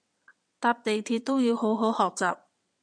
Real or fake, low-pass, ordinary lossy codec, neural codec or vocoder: fake; 9.9 kHz; MP3, 96 kbps; vocoder, 22.05 kHz, 80 mel bands, WaveNeXt